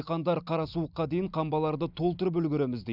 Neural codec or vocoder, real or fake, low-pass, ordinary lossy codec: none; real; 5.4 kHz; none